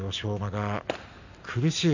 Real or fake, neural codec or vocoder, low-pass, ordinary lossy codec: real; none; 7.2 kHz; none